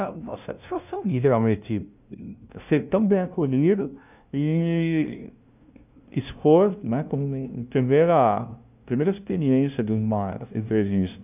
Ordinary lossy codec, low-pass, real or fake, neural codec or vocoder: none; 3.6 kHz; fake; codec, 16 kHz, 0.5 kbps, FunCodec, trained on LibriTTS, 25 frames a second